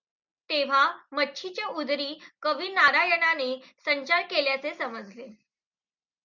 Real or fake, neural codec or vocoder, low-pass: real; none; 7.2 kHz